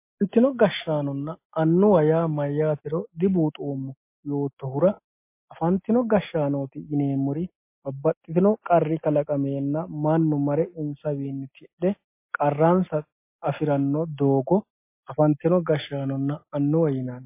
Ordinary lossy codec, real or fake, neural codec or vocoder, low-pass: MP3, 24 kbps; real; none; 3.6 kHz